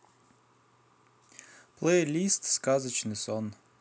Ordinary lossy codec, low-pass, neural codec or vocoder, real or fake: none; none; none; real